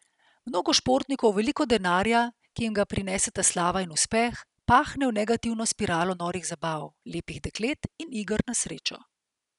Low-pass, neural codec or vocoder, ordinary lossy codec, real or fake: 10.8 kHz; none; none; real